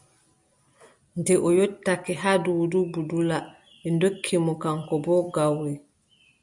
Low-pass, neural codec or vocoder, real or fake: 10.8 kHz; none; real